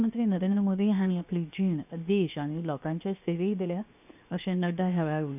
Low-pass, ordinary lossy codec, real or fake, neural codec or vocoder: 3.6 kHz; none; fake; codec, 16 kHz, 0.7 kbps, FocalCodec